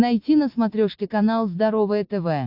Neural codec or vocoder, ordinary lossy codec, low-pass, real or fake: none; AAC, 48 kbps; 5.4 kHz; real